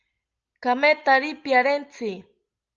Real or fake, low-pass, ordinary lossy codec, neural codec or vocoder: real; 7.2 kHz; Opus, 24 kbps; none